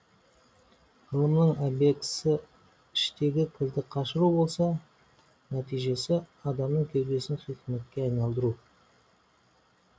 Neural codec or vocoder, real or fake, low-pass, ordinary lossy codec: none; real; none; none